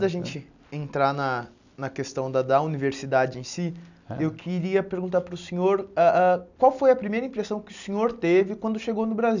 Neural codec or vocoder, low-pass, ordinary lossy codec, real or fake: none; 7.2 kHz; none; real